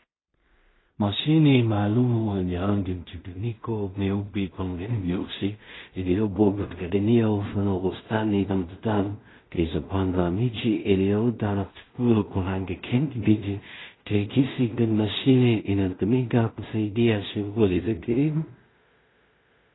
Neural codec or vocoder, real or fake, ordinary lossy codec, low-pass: codec, 16 kHz in and 24 kHz out, 0.4 kbps, LongCat-Audio-Codec, two codebook decoder; fake; AAC, 16 kbps; 7.2 kHz